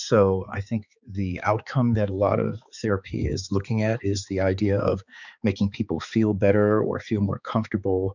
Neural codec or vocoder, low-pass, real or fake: codec, 16 kHz, 4 kbps, X-Codec, HuBERT features, trained on balanced general audio; 7.2 kHz; fake